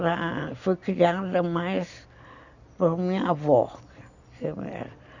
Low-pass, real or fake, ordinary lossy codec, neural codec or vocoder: 7.2 kHz; real; none; none